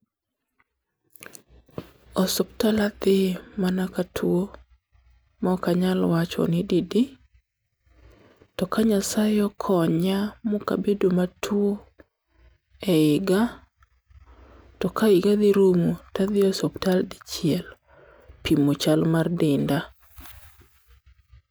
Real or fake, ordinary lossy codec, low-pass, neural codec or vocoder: real; none; none; none